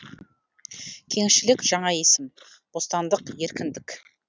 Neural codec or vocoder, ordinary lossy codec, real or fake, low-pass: none; none; real; none